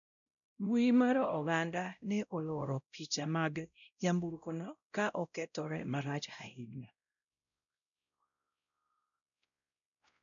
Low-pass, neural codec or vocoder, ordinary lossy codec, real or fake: 7.2 kHz; codec, 16 kHz, 0.5 kbps, X-Codec, WavLM features, trained on Multilingual LibriSpeech; none; fake